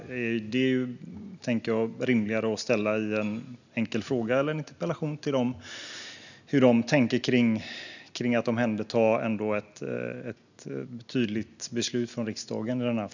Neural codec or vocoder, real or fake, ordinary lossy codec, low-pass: none; real; none; 7.2 kHz